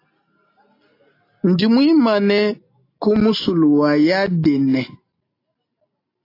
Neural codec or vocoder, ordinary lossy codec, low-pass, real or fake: none; AAC, 32 kbps; 5.4 kHz; real